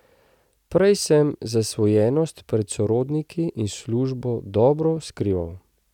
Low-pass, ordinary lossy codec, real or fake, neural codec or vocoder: 19.8 kHz; none; real; none